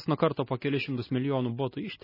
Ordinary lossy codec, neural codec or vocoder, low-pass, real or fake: MP3, 24 kbps; none; 5.4 kHz; real